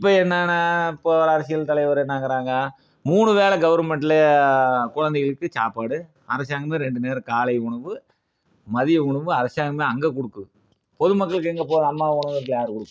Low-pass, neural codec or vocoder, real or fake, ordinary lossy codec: none; none; real; none